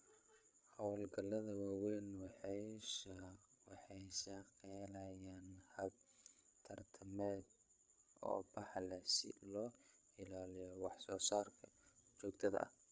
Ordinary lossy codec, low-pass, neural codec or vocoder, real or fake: none; none; codec, 16 kHz, 8 kbps, FreqCodec, larger model; fake